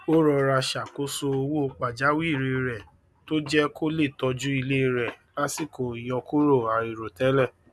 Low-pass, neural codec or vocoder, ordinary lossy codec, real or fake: none; none; none; real